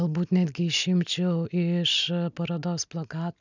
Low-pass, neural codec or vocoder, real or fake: 7.2 kHz; none; real